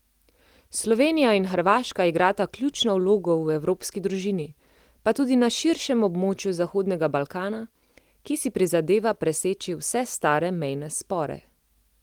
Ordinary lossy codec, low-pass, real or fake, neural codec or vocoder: Opus, 24 kbps; 19.8 kHz; real; none